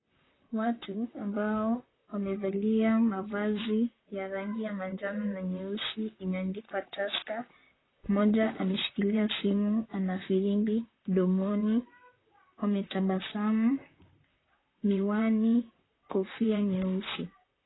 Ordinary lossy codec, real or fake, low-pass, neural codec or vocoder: AAC, 16 kbps; fake; 7.2 kHz; vocoder, 44.1 kHz, 128 mel bands, Pupu-Vocoder